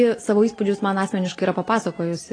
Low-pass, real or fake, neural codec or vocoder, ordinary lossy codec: 9.9 kHz; real; none; AAC, 32 kbps